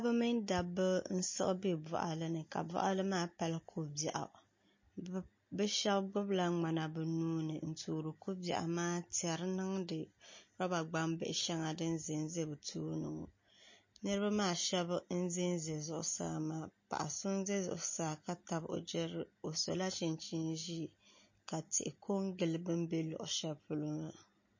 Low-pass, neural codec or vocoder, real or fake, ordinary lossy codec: 7.2 kHz; none; real; MP3, 32 kbps